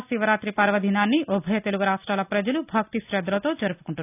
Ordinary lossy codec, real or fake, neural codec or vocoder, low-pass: none; real; none; 3.6 kHz